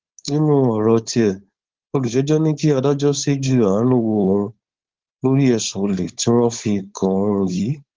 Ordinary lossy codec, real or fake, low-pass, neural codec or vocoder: Opus, 16 kbps; fake; 7.2 kHz; codec, 16 kHz, 4.8 kbps, FACodec